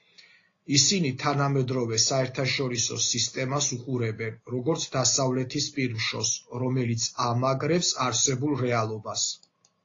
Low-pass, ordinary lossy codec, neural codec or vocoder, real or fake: 7.2 kHz; AAC, 32 kbps; none; real